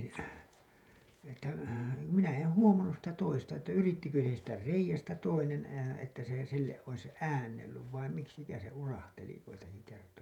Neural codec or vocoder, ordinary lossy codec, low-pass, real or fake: vocoder, 48 kHz, 128 mel bands, Vocos; none; 19.8 kHz; fake